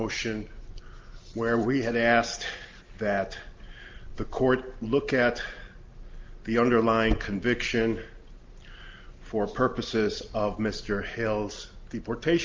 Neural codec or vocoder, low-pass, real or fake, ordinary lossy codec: none; 7.2 kHz; real; Opus, 32 kbps